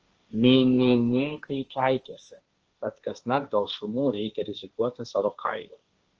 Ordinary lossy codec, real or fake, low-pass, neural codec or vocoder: Opus, 24 kbps; fake; 7.2 kHz; codec, 16 kHz, 1.1 kbps, Voila-Tokenizer